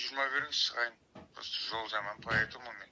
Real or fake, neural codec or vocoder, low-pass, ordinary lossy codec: real; none; none; none